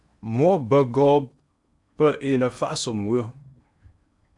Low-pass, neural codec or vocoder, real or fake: 10.8 kHz; codec, 16 kHz in and 24 kHz out, 0.8 kbps, FocalCodec, streaming, 65536 codes; fake